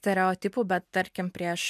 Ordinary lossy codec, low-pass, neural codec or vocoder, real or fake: MP3, 96 kbps; 14.4 kHz; none; real